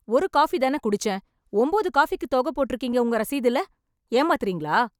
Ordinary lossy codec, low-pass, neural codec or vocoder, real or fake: none; 19.8 kHz; none; real